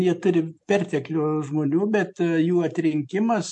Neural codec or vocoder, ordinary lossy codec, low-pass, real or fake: none; MP3, 64 kbps; 10.8 kHz; real